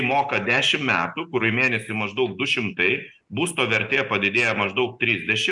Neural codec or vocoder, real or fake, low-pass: none; real; 10.8 kHz